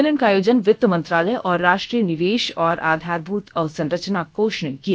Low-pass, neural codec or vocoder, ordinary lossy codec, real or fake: none; codec, 16 kHz, about 1 kbps, DyCAST, with the encoder's durations; none; fake